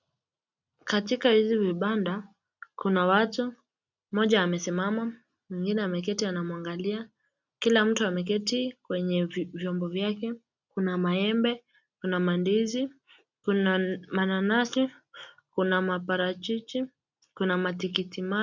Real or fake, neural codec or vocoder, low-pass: real; none; 7.2 kHz